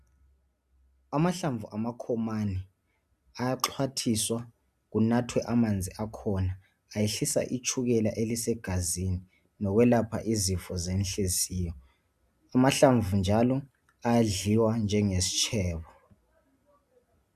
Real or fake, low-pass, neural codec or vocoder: real; 14.4 kHz; none